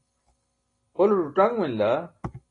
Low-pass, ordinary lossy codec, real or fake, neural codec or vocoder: 9.9 kHz; AAC, 32 kbps; real; none